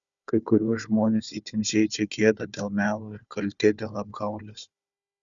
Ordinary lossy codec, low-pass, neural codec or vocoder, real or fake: Opus, 64 kbps; 7.2 kHz; codec, 16 kHz, 4 kbps, FunCodec, trained on Chinese and English, 50 frames a second; fake